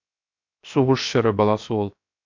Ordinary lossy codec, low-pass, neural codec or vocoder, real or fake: MP3, 64 kbps; 7.2 kHz; codec, 16 kHz, 0.7 kbps, FocalCodec; fake